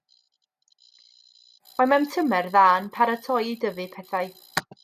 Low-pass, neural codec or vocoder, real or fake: 14.4 kHz; none; real